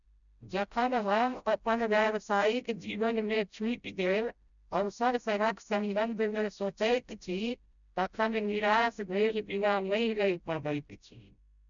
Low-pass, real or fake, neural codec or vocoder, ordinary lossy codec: 7.2 kHz; fake; codec, 16 kHz, 0.5 kbps, FreqCodec, smaller model; none